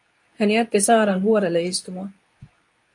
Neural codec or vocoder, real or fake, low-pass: codec, 24 kHz, 0.9 kbps, WavTokenizer, medium speech release version 2; fake; 10.8 kHz